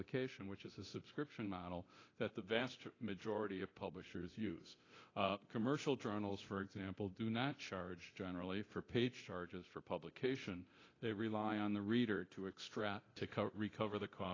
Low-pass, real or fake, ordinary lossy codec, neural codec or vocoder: 7.2 kHz; fake; AAC, 32 kbps; codec, 24 kHz, 0.9 kbps, DualCodec